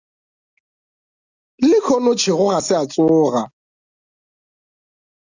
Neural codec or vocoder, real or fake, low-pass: none; real; 7.2 kHz